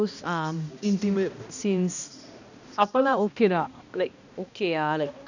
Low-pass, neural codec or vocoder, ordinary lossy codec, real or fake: 7.2 kHz; codec, 16 kHz, 1 kbps, X-Codec, HuBERT features, trained on balanced general audio; none; fake